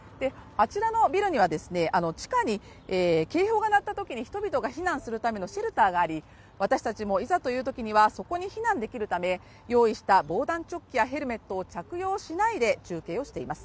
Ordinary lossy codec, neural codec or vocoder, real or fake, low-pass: none; none; real; none